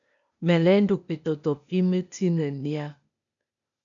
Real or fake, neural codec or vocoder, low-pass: fake; codec, 16 kHz, 0.8 kbps, ZipCodec; 7.2 kHz